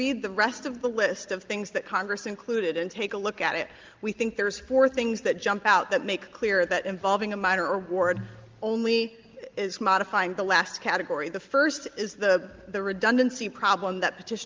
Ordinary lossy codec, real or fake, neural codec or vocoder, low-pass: Opus, 32 kbps; real; none; 7.2 kHz